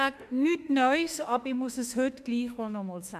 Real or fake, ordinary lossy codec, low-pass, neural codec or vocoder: fake; none; 14.4 kHz; autoencoder, 48 kHz, 32 numbers a frame, DAC-VAE, trained on Japanese speech